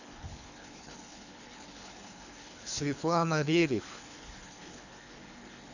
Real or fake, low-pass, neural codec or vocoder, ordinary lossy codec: fake; 7.2 kHz; codec, 24 kHz, 3 kbps, HILCodec; none